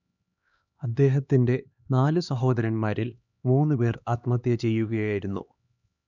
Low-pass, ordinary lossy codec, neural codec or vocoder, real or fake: 7.2 kHz; none; codec, 16 kHz, 2 kbps, X-Codec, HuBERT features, trained on LibriSpeech; fake